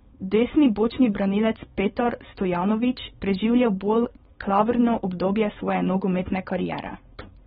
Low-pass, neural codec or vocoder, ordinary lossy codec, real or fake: 7.2 kHz; codec, 16 kHz, 4.8 kbps, FACodec; AAC, 16 kbps; fake